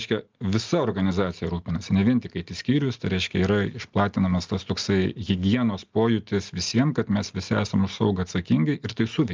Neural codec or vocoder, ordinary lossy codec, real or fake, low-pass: none; Opus, 32 kbps; real; 7.2 kHz